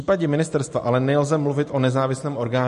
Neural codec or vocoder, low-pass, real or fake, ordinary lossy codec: none; 14.4 kHz; real; MP3, 48 kbps